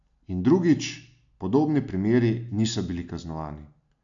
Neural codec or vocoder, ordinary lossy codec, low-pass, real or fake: none; MP3, 64 kbps; 7.2 kHz; real